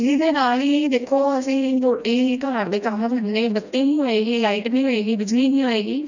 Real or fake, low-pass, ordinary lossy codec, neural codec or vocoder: fake; 7.2 kHz; none; codec, 16 kHz, 1 kbps, FreqCodec, smaller model